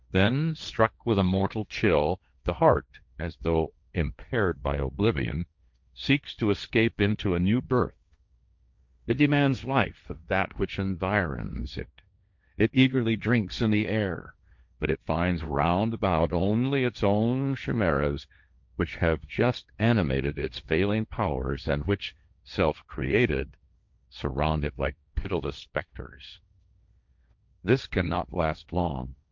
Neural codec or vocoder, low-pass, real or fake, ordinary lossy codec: codec, 16 kHz, 1.1 kbps, Voila-Tokenizer; 7.2 kHz; fake; MP3, 64 kbps